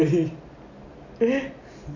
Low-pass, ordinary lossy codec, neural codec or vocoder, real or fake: 7.2 kHz; none; none; real